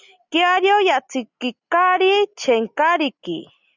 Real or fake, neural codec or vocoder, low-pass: real; none; 7.2 kHz